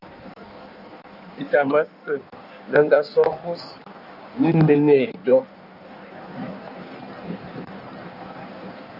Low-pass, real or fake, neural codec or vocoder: 5.4 kHz; fake; codec, 16 kHz in and 24 kHz out, 1.1 kbps, FireRedTTS-2 codec